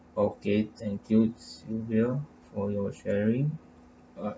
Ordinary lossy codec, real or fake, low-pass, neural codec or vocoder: none; real; none; none